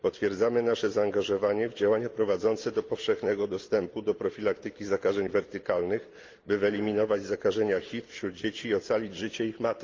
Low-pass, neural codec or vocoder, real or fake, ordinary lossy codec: 7.2 kHz; none; real; Opus, 24 kbps